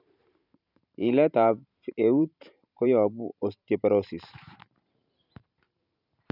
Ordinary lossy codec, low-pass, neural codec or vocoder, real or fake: none; 5.4 kHz; vocoder, 44.1 kHz, 128 mel bands every 512 samples, BigVGAN v2; fake